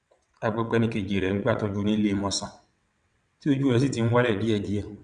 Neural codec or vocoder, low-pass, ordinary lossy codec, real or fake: vocoder, 22.05 kHz, 80 mel bands, WaveNeXt; 9.9 kHz; none; fake